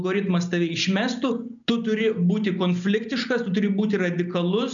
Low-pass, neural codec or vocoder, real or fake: 7.2 kHz; none; real